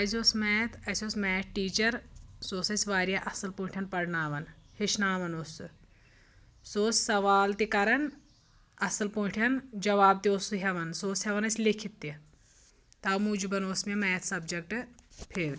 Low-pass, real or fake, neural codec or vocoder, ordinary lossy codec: none; real; none; none